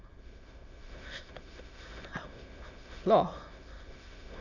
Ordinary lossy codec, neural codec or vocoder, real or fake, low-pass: none; autoencoder, 22.05 kHz, a latent of 192 numbers a frame, VITS, trained on many speakers; fake; 7.2 kHz